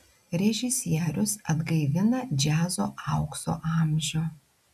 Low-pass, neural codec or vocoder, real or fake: 14.4 kHz; none; real